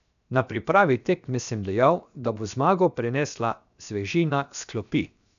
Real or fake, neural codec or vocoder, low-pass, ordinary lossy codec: fake; codec, 16 kHz, 0.7 kbps, FocalCodec; 7.2 kHz; none